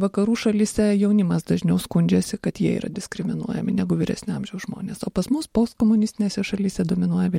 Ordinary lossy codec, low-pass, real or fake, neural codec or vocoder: MP3, 64 kbps; 19.8 kHz; fake; vocoder, 44.1 kHz, 128 mel bands every 512 samples, BigVGAN v2